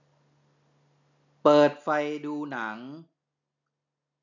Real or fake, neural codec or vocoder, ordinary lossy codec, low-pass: real; none; none; 7.2 kHz